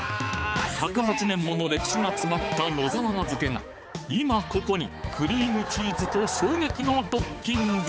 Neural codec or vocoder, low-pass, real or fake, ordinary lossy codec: codec, 16 kHz, 4 kbps, X-Codec, HuBERT features, trained on balanced general audio; none; fake; none